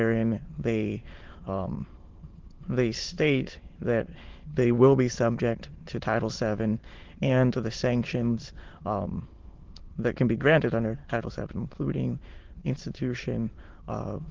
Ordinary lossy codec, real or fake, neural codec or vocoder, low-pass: Opus, 16 kbps; fake; autoencoder, 22.05 kHz, a latent of 192 numbers a frame, VITS, trained on many speakers; 7.2 kHz